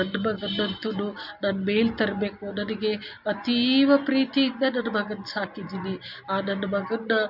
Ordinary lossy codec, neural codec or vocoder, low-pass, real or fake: none; none; 5.4 kHz; real